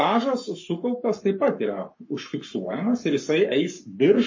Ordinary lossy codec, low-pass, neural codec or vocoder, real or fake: MP3, 32 kbps; 7.2 kHz; codec, 44.1 kHz, 7.8 kbps, Pupu-Codec; fake